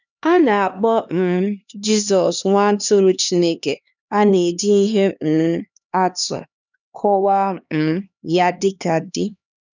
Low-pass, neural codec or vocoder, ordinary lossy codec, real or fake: 7.2 kHz; codec, 16 kHz, 2 kbps, X-Codec, HuBERT features, trained on LibriSpeech; none; fake